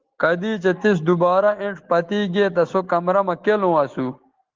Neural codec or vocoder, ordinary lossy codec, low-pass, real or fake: none; Opus, 16 kbps; 7.2 kHz; real